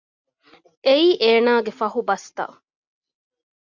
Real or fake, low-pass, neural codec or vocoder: real; 7.2 kHz; none